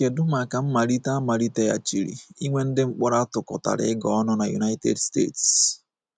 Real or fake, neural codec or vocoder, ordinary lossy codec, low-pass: real; none; none; none